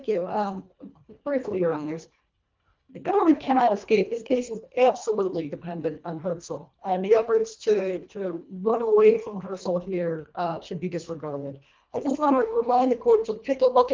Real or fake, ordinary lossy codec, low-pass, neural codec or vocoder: fake; Opus, 24 kbps; 7.2 kHz; codec, 24 kHz, 1.5 kbps, HILCodec